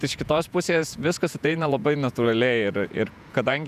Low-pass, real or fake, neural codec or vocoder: 14.4 kHz; fake; vocoder, 48 kHz, 128 mel bands, Vocos